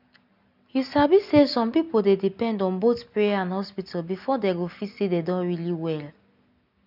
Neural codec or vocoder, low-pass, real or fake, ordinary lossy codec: none; 5.4 kHz; real; none